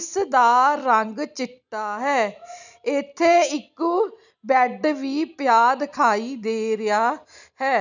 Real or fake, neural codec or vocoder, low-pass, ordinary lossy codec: real; none; 7.2 kHz; none